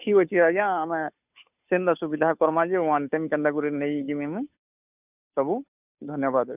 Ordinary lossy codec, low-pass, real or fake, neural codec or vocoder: none; 3.6 kHz; fake; codec, 16 kHz, 2 kbps, FunCodec, trained on Chinese and English, 25 frames a second